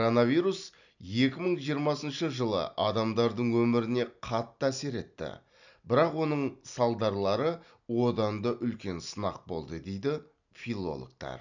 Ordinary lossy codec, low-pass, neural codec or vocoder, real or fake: none; 7.2 kHz; none; real